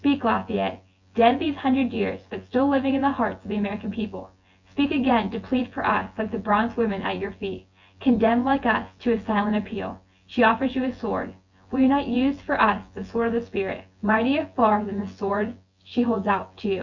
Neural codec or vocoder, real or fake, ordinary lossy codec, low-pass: vocoder, 24 kHz, 100 mel bands, Vocos; fake; Opus, 64 kbps; 7.2 kHz